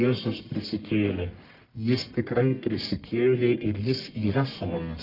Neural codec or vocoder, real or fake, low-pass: codec, 44.1 kHz, 1.7 kbps, Pupu-Codec; fake; 5.4 kHz